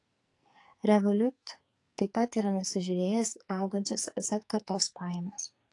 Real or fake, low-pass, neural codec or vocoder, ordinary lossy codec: fake; 10.8 kHz; codec, 44.1 kHz, 2.6 kbps, SNAC; AAC, 48 kbps